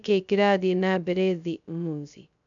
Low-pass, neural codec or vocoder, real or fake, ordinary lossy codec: 7.2 kHz; codec, 16 kHz, 0.2 kbps, FocalCodec; fake; none